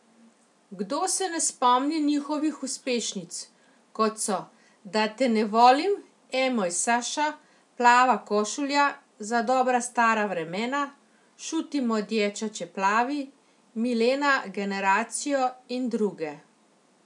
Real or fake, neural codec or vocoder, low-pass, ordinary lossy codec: real; none; 10.8 kHz; none